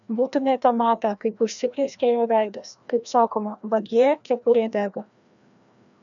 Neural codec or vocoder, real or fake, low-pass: codec, 16 kHz, 1 kbps, FreqCodec, larger model; fake; 7.2 kHz